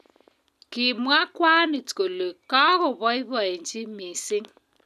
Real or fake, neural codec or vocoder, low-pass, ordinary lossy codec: real; none; 14.4 kHz; none